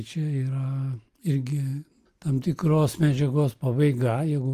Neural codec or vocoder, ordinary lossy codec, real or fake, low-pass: none; Opus, 32 kbps; real; 14.4 kHz